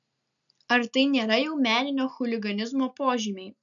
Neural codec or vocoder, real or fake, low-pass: none; real; 7.2 kHz